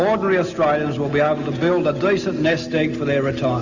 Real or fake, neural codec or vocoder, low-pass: real; none; 7.2 kHz